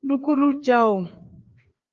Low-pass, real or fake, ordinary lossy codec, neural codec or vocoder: 7.2 kHz; fake; Opus, 32 kbps; codec, 16 kHz, 2 kbps, FreqCodec, larger model